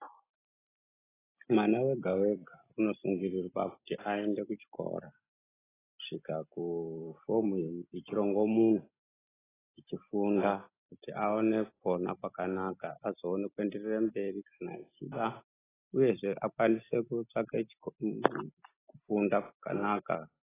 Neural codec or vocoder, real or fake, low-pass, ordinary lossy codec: none; real; 3.6 kHz; AAC, 16 kbps